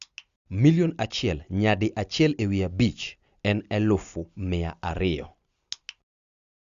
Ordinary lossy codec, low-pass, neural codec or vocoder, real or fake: Opus, 64 kbps; 7.2 kHz; none; real